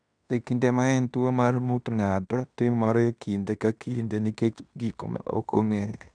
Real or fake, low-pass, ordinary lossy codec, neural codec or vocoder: fake; 9.9 kHz; none; codec, 16 kHz in and 24 kHz out, 0.9 kbps, LongCat-Audio-Codec, fine tuned four codebook decoder